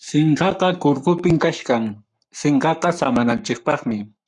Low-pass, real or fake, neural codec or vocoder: 10.8 kHz; fake; codec, 44.1 kHz, 7.8 kbps, Pupu-Codec